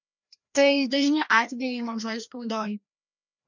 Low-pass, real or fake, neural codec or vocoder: 7.2 kHz; fake; codec, 16 kHz, 1 kbps, FreqCodec, larger model